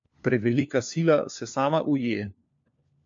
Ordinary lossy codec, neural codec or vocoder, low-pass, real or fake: MP3, 48 kbps; codec, 16 kHz, 4 kbps, FunCodec, trained on LibriTTS, 50 frames a second; 7.2 kHz; fake